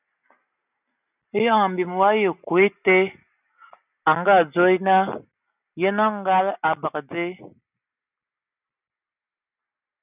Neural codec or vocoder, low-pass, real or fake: none; 3.6 kHz; real